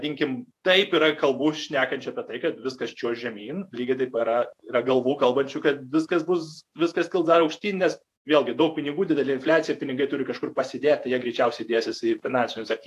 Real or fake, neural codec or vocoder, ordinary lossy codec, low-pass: fake; vocoder, 48 kHz, 128 mel bands, Vocos; AAC, 64 kbps; 14.4 kHz